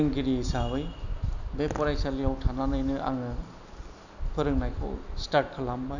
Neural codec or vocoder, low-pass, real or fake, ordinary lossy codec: none; 7.2 kHz; real; none